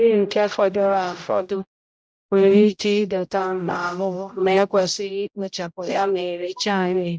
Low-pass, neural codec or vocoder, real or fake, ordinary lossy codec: none; codec, 16 kHz, 0.5 kbps, X-Codec, HuBERT features, trained on general audio; fake; none